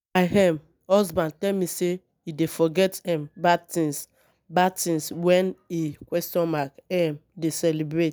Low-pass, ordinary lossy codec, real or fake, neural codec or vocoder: none; none; real; none